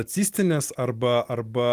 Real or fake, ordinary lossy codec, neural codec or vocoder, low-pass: real; Opus, 24 kbps; none; 14.4 kHz